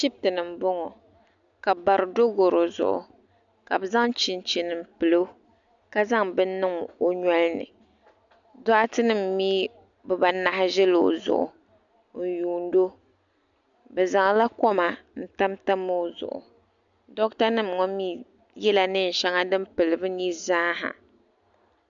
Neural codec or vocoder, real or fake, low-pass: none; real; 7.2 kHz